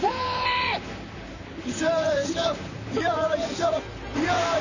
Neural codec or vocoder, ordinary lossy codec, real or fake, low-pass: vocoder, 44.1 kHz, 128 mel bands, Pupu-Vocoder; none; fake; 7.2 kHz